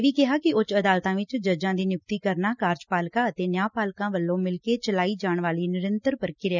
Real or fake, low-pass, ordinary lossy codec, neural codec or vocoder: real; 7.2 kHz; none; none